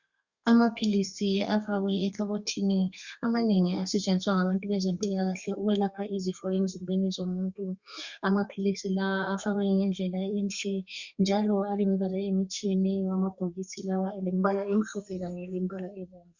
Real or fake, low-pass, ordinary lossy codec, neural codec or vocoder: fake; 7.2 kHz; Opus, 64 kbps; codec, 32 kHz, 1.9 kbps, SNAC